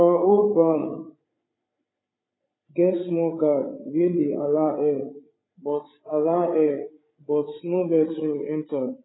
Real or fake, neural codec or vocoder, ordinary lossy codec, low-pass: fake; codec, 16 kHz, 8 kbps, FreqCodec, larger model; AAC, 16 kbps; 7.2 kHz